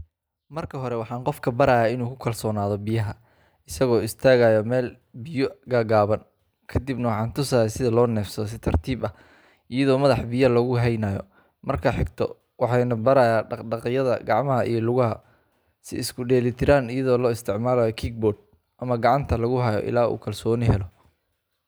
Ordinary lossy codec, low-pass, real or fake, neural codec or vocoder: none; none; real; none